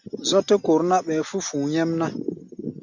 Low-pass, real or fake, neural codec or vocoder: 7.2 kHz; real; none